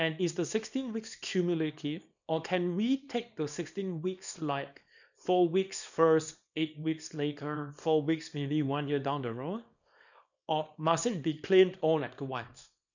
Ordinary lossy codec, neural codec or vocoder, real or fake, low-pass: none; codec, 24 kHz, 0.9 kbps, WavTokenizer, small release; fake; 7.2 kHz